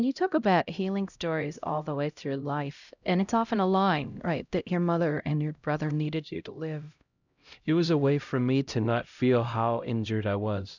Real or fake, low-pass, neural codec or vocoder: fake; 7.2 kHz; codec, 16 kHz, 0.5 kbps, X-Codec, HuBERT features, trained on LibriSpeech